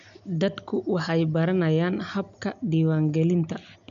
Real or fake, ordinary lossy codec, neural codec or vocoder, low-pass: real; none; none; 7.2 kHz